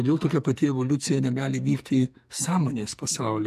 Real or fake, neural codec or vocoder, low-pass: fake; codec, 44.1 kHz, 2.6 kbps, SNAC; 14.4 kHz